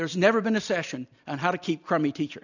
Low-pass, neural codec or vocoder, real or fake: 7.2 kHz; none; real